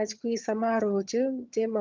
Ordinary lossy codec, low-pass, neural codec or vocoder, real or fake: Opus, 24 kbps; 7.2 kHz; vocoder, 22.05 kHz, 80 mel bands, HiFi-GAN; fake